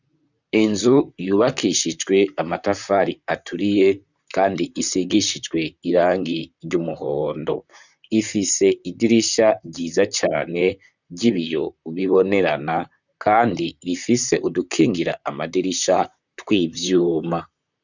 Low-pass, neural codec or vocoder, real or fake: 7.2 kHz; vocoder, 44.1 kHz, 128 mel bands, Pupu-Vocoder; fake